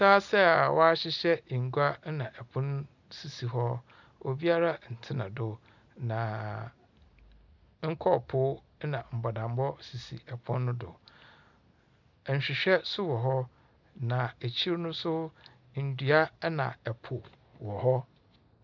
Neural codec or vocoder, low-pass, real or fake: none; 7.2 kHz; real